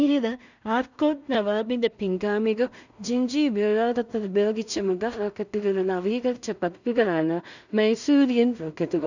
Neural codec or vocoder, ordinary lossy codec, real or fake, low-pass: codec, 16 kHz in and 24 kHz out, 0.4 kbps, LongCat-Audio-Codec, two codebook decoder; none; fake; 7.2 kHz